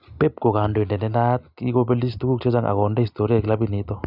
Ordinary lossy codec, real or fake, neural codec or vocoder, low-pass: none; real; none; 5.4 kHz